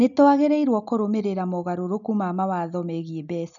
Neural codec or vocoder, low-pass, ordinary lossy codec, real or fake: none; 7.2 kHz; MP3, 96 kbps; real